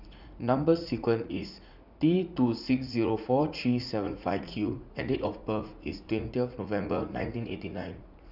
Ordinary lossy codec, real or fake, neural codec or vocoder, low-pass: none; fake; vocoder, 44.1 kHz, 80 mel bands, Vocos; 5.4 kHz